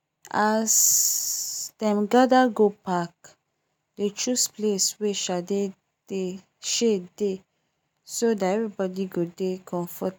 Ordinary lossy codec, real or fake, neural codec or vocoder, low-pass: none; real; none; none